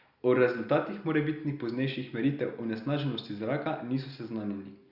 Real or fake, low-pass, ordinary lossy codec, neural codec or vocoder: real; 5.4 kHz; Opus, 64 kbps; none